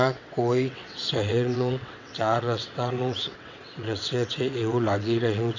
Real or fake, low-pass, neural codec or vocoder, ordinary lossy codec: fake; 7.2 kHz; vocoder, 22.05 kHz, 80 mel bands, WaveNeXt; none